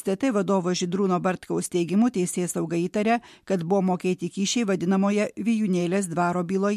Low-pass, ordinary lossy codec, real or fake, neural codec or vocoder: 14.4 kHz; MP3, 64 kbps; real; none